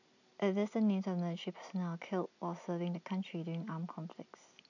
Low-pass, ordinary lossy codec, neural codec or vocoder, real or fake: 7.2 kHz; none; none; real